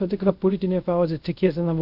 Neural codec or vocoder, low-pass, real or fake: codec, 24 kHz, 0.5 kbps, DualCodec; 5.4 kHz; fake